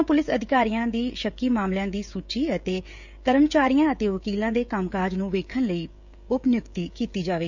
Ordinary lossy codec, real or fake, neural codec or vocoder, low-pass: none; fake; codec, 16 kHz, 16 kbps, FreqCodec, smaller model; 7.2 kHz